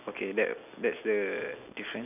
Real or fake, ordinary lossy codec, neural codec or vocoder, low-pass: real; none; none; 3.6 kHz